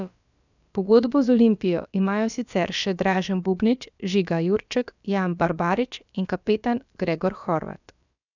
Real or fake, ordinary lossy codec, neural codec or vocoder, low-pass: fake; none; codec, 16 kHz, about 1 kbps, DyCAST, with the encoder's durations; 7.2 kHz